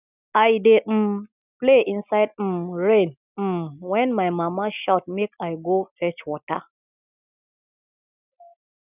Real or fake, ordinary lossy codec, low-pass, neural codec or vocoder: real; none; 3.6 kHz; none